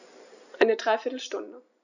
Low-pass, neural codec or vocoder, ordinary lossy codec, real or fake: 7.2 kHz; none; none; real